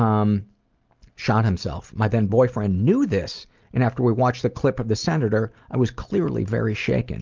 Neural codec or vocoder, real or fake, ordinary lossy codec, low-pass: none; real; Opus, 32 kbps; 7.2 kHz